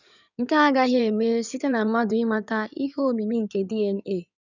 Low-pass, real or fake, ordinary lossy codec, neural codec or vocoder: 7.2 kHz; fake; none; codec, 16 kHz in and 24 kHz out, 2.2 kbps, FireRedTTS-2 codec